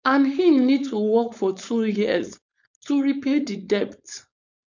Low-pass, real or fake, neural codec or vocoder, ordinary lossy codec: 7.2 kHz; fake; codec, 16 kHz, 4.8 kbps, FACodec; none